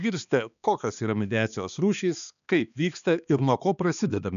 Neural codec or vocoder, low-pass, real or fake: codec, 16 kHz, 2 kbps, X-Codec, HuBERT features, trained on balanced general audio; 7.2 kHz; fake